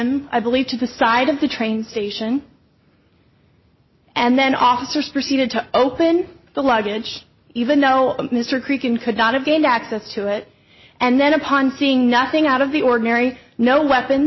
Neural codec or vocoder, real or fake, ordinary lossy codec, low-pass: none; real; MP3, 24 kbps; 7.2 kHz